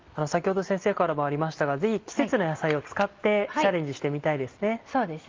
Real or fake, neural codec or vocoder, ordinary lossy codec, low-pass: real; none; Opus, 32 kbps; 7.2 kHz